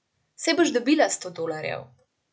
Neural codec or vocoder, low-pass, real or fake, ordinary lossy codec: none; none; real; none